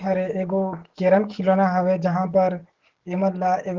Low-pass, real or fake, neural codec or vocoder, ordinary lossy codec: 7.2 kHz; fake; codec, 44.1 kHz, 7.8 kbps, DAC; Opus, 16 kbps